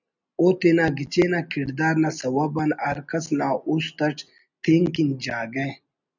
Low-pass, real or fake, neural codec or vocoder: 7.2 kHz; real; none